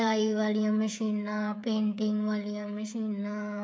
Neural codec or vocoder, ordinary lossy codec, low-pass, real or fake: codec, 16 kHz, 8 kbps, FreqCodec, smaller model; none; none; fake